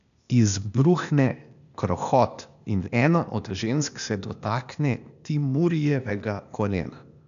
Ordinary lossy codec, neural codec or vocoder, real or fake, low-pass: none; codec, 16 kHz, 0.8 kbps, ZipCodec; fake; 7.2 kHz